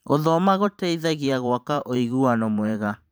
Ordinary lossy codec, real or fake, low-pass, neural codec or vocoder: none; fake; none; vocoder, 44.1 kHz, 128 mel bands every 512 samples, BigVGAN v2